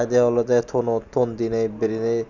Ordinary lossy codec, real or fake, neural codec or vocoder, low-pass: none; real; none; 7.2 kHz